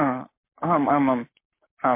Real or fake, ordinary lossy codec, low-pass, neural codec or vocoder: fake; MP3, 24 kbps; 3.6 kHz; codec, 44.1 kHz, 7.8 kbps, Pupu-Codec